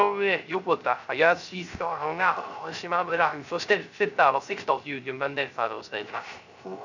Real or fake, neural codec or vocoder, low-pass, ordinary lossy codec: fake; codec, 16 kHz, 0.3 kbps, FocalCodec; 7.2 kHz; none